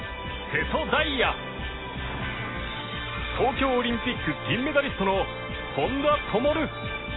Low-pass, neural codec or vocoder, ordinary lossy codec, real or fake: 7.2 kHz; none; AAC, 16 kbps; real